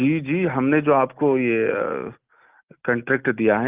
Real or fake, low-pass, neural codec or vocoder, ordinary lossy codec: real; 3.6 kHz; none; Opus, 24 kbps